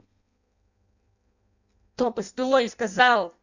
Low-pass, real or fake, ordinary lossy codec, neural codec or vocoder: 7.2 kHz; fake; none; codec, 16 kHz in and 24 kHz out, 0.6 kbps, FireRedTTS-2 codec